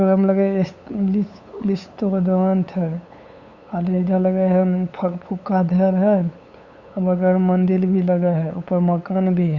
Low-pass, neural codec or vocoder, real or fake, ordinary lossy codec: 7.2 kHz; codec, 16 kHz, 8 kbps, FunCodec, trained on LibriTTS, 25 frames a second; fake; Opus, 64 kbps